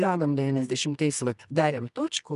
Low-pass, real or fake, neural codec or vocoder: 10.8 kHz; fake; codec, 24 kHz, 0.9 kbps, WavTokenizer, medium music audio release